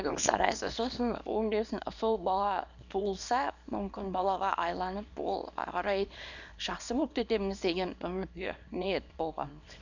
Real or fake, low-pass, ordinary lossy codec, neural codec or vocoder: fake; 7.2 kHz; none; codec, 24 kHz, 0.9 kbps, WavTokenizer, small release